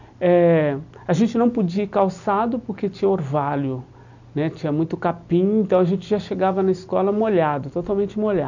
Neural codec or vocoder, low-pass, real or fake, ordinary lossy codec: none; 7.2 kHz; real; none